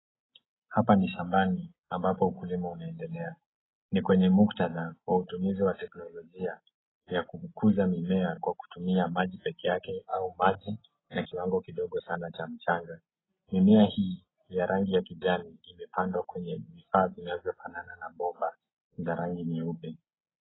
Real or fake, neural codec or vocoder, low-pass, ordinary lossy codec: real; none; 7.2 kHz; AAC, 16 kbps